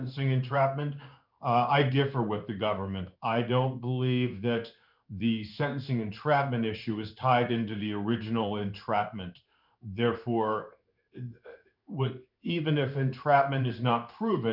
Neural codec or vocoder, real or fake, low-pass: codec, 16 kHz in and 24 kHz out, 1 kbps, XY-Tokenizer; fake; 5.4 kHz